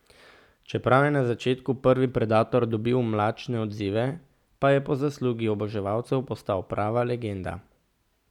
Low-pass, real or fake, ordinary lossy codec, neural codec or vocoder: 19.8 kHz; real; none; none